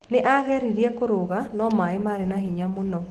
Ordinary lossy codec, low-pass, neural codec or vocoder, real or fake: Opus, 16 kbps; 14.4 kHz; autoencoder, 48 kHz, 128 numbers a frame, DAC-VAE, trained on Japanese speech; fake